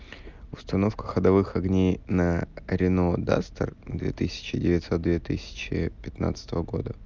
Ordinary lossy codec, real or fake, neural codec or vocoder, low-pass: Opus, 24 kbps; real; none; 7.2 kHz